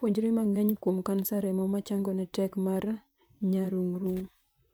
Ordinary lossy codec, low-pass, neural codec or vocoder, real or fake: none; none; vocoder, 44.1 kHz, 128 mel bands, Pupu-Vocoder; fake